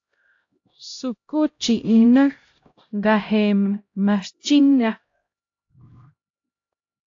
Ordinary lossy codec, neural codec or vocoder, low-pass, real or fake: AAC, 48 kbps; codec, 16 kHz, 0.5 kbps, X-Codec, HuBERT features, trained on LibriSpeech; 7.2 kHz; fake